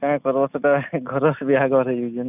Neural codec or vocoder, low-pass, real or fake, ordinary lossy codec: none; 3.6 kHz; real; none